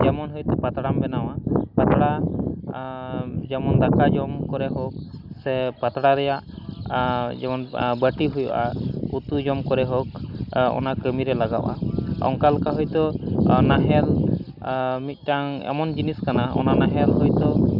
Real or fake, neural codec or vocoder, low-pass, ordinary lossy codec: real; none; 5.4 kHz; none